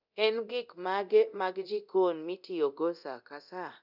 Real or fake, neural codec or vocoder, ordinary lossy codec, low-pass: fake; codec, 24 kHz, 0.9 kbps, DualCodec; none; 5.4 kHz